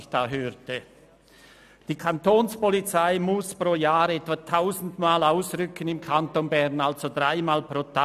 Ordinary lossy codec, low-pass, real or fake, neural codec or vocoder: none; 14.4 kHz; real; none